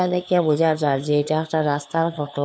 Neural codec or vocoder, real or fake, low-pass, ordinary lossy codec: codec, 16 kHz, 2 kbps, FunCodec, trained on LibriTTS, 25 frames a second; fake; none; none